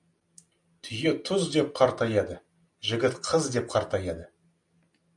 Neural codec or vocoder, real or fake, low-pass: none; real; 10.8 kHz